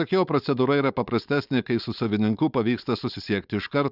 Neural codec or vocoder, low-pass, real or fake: codec, 16 kHz, 4.8 kbps, FACodec; 5.4 kHz; fake